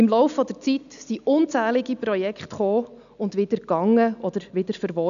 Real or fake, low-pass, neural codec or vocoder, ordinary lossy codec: real; 7.2 kHz; none; none